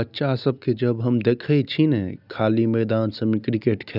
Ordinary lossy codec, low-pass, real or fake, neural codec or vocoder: none; 5.4 kHz; real; none